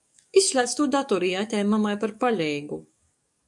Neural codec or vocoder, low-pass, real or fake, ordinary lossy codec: codec, 44.1 kHz, 7.8 kbps, DAC; 10.8 kHz; fake; MP3, 96 kbps